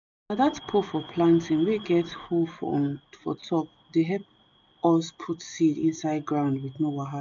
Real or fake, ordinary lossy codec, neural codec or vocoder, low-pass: real; none; none; 7.2 kHz